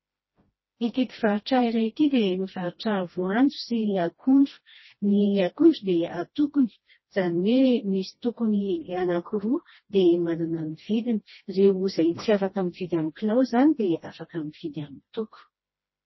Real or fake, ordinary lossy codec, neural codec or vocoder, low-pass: fake; MP3, 24 kbps; codec, 16 kHz, 1 kbps, FreqCodec, smaller model; 7.2 kHz